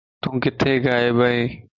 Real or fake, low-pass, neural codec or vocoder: real; 7.2 kHz; none